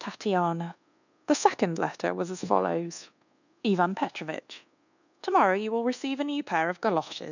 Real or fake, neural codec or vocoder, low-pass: fake; codec, 24 kHz, 1.2 kbps, DualCodec; 7.2 kHz